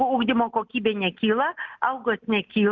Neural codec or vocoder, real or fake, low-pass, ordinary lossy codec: none; real; 7.2 kHz; Opus, 24 kbps